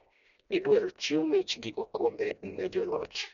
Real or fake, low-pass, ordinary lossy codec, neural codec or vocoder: fake; 7.2 kHz; none; codec, 16 kHz, 1 kbps, FreqCodec, smaller model